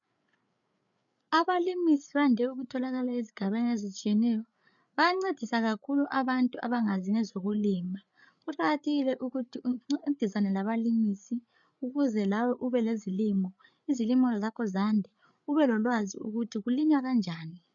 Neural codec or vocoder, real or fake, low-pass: codec, 16 kHz, 8 kbps, FreqCodec, larger model; fake; 7.2 kHz